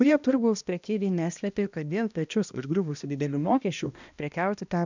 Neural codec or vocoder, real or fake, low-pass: codec, 16 kHz, 1 kbps, X-Codec, HuBERT features, trained on balanced general audio; fake; 7.2 kHz